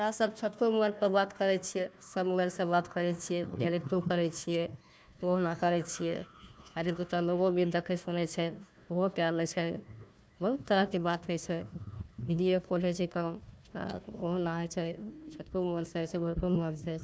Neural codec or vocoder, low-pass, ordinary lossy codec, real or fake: codec, 16 kHz, 1 kbps, FunCodec, trained on Chinese and English, 50 frames a second; none; none; fake